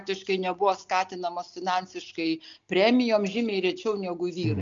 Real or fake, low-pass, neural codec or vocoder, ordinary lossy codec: real; 7.2 kHz; none; MP3, 64 kbps